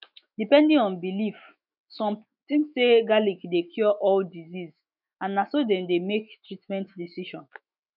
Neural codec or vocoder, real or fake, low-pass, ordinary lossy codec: autoencoder, 48 kHz, 128 numbers a frame, DAC-VAE, trained on Japanese speech; fake; 5.4 kHz; none